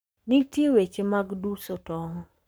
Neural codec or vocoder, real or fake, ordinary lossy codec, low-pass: codec, 44.1 kHz, 7.8 kbps, Pupu-Codec; fake; none; none